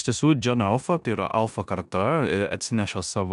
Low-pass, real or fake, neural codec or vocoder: 10.8 kHz; fake; codec, 16 kHz in and 24 kHz out, 0.9 kbps, LongCat-Audio-Codec, four codebook decoder